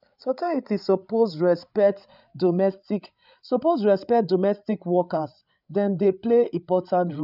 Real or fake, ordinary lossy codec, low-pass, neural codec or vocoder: fake; none; 5.4 kHz; codec, 16 kHz, 8 kbps, FreqCodec, larger model